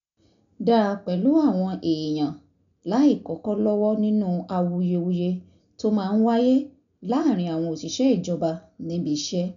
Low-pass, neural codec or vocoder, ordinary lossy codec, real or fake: 7.2 kHz; none; none; real